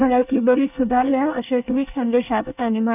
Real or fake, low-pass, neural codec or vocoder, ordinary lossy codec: fake; 3.6 kHz; codec, 24 kHz, 1 kbps, SNAC; none